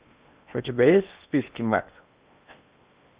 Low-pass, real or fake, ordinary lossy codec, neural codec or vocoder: 3.6 kHz; fake; Opus, 64 kbps; codec, 16 kHz in and 24 kHz out, 0.8 kbps, FocalCodec, streaming, 65536 codes